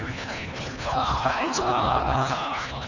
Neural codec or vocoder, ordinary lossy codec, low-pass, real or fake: codec, 24 kHz, 1.5 kbps, HILCodec; none; 7.2 kHz; fake